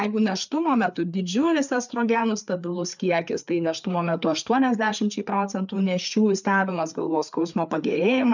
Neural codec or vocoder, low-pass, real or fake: codec, 16 kHz, 4 kbps, FreqCodec, larger model; 7.2 kHz; fake